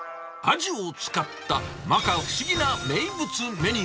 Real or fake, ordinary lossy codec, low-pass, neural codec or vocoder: real; none; none; none